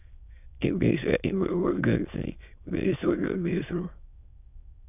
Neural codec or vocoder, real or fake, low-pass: autoencoder, 22.05 kHz, a latent of 192 numbers a frame, VITS, trained on many speakers; fake; 3.6 kHz